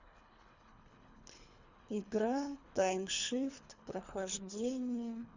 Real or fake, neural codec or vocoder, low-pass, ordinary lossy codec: fake; codec, 24 kHz, 3 kbps, HILCodec; 7.2 kHz; Opus, 64 kbps